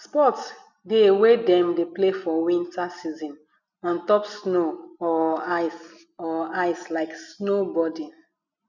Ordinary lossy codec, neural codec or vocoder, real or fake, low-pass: none; none; real; 7.2 kHz